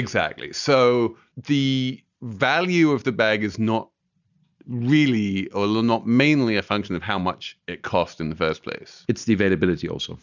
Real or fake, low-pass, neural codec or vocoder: real; 7.2 kHz; none